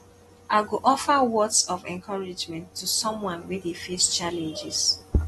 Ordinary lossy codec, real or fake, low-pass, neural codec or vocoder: AAC, 32 kbps; real; 19.8 kHz; none